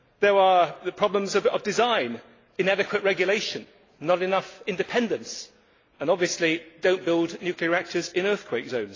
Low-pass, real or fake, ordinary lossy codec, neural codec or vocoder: 7.2 kHz; real; AAC, 32 kbps; none